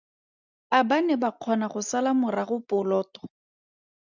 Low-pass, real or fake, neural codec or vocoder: 7.2 kHz; real; none